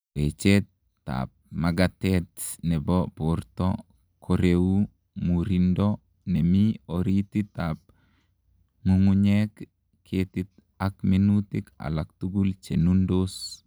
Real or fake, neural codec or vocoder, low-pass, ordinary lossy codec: real; none; none; none